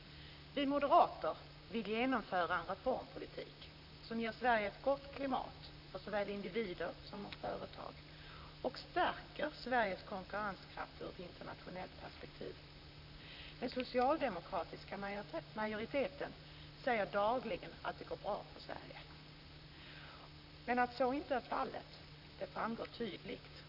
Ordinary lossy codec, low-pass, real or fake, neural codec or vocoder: none; 5.4 kHz; fake; vocoder, 44.1 kHz, 128 mel bands, Pupu-Vocoder